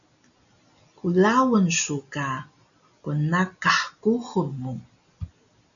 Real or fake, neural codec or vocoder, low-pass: real; none; 7.2 kHz